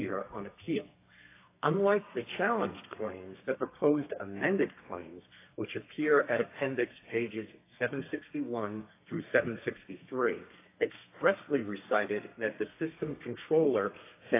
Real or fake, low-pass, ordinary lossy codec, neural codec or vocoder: fake; 3.6 kHz; AAC, 24 kbps; codec, 32 kHz, 1.9 kbps, SNAC